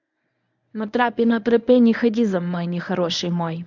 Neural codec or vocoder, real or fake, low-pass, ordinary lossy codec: codec, 24 kHz, 0.9 kbps, WavTokenizer, medium speech release version 1; fake; 7.2 kHz; none